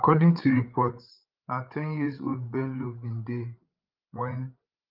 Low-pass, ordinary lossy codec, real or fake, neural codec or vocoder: 5.4 kHz; Opus, 32 kbps; fake; codec, 16 kHz, 8 kbps, FreqCodec, larger model